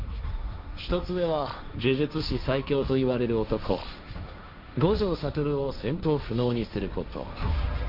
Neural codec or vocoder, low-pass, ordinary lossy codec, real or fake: codec, 16 kHz, 1.1 kbps, Voila-Tokenizer; 5.4 kHz; none; fake